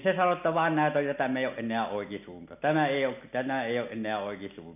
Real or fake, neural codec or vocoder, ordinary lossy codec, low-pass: real; none; none; 3.6 kHz